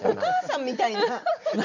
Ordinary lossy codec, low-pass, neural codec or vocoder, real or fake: none; 7.2 kHz; none; real